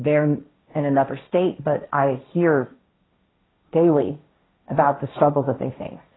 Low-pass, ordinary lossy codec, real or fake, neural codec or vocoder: 7.2 kHz; AAC, 16 kbps; fake; codec, 16 kHz, 1.1 kbps, Voila-Tokenizer